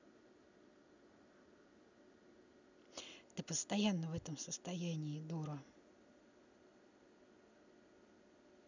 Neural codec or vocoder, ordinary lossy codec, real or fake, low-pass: none; none; real; 7.2 kHz